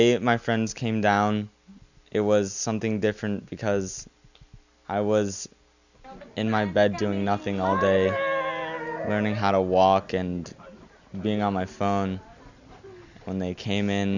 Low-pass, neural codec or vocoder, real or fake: 7.2 kHz; none; real